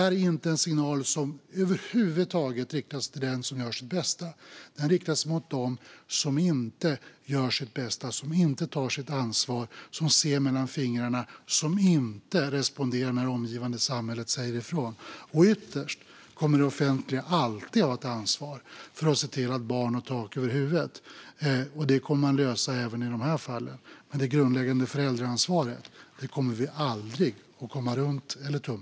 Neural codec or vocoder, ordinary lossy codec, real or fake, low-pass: none; none; real; none